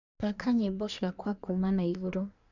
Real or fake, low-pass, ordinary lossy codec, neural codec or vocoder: fake; 7.2 kHz; none; codec, 24 kHz, 1 kbps, SNAC